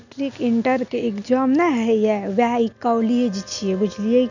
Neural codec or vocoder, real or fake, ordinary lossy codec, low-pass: none; real; none; 7.2 kHz